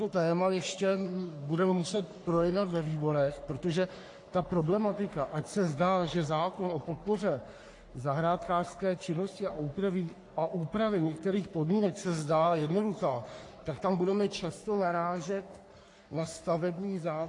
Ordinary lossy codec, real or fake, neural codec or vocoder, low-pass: AAC, 48 kbps; fake; codec, 44.1 kHz, 3.4 kbps, Pupu-Codec; 10.8 kHz